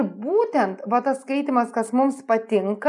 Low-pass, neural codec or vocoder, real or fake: 10.8 kHz; none; real